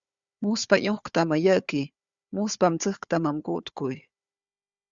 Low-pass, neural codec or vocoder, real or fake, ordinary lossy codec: 7.2 kHz; codec, 16 kHz, 4 kbps, FunCodec, trained on Chinese and English, 50 frames a second; fake; Opus, 64 kbps